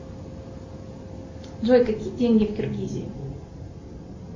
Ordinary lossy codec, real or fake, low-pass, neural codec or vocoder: MP3, 32 kbps; real; 7.2 kHz; none